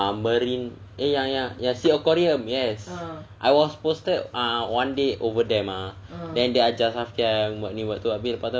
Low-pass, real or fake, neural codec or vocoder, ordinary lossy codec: none; real; none; none